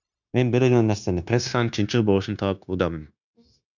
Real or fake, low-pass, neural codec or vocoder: fake; 7.2 kHz; codec, 16 kHz, 0.9 kbps, LongCat-Audio-Codec